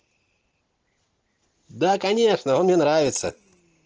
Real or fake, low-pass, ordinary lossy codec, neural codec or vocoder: real; 7.2 kHz; Opus, 16 kbps; none